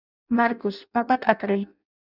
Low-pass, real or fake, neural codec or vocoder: 5.4 kHz; fake; codec, 16 kHz in and 24 kHz out, 0.6 kbps, FireRedTTS-2 codec